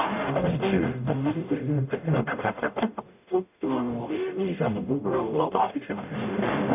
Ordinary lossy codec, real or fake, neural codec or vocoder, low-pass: none; fake; codec, 44.1 kHz, 0.9 kbps, DAC; 3.6 kHz